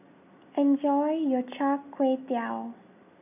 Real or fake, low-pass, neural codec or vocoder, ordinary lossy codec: real; 3.6 kHz; none; none